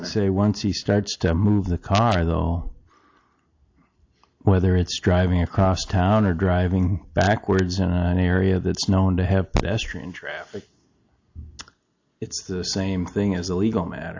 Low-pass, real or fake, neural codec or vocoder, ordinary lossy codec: 7.2 kHz; real; none; AAC, 48 kbps